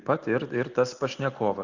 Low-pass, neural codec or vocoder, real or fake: 7.2 kHz; none; real